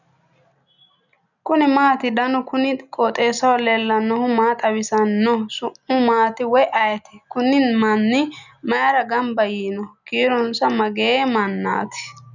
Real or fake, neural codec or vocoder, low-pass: real; none; 7.2 kHz